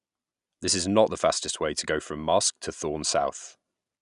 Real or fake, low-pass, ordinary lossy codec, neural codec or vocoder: real; 10.8 kHz; none; none